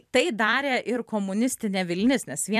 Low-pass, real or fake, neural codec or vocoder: 14.4 kHz; fake; vocoder, 44.1 kHz, 128 mel bands every 256 samples, BigVGAN v2